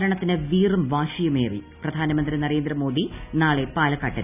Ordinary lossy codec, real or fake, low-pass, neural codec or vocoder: none; real; 3.6 kHz; none